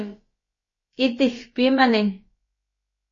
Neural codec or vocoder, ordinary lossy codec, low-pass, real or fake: codec, 16 kHz, about 1 kbps, DyCAST, with the encoder's durations; MP3, 32 kbps; 7.2 kHz; fake